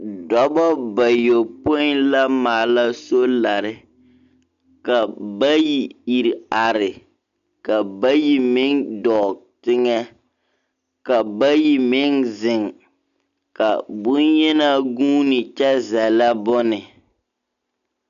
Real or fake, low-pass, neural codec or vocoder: real; 7.2 kHz; none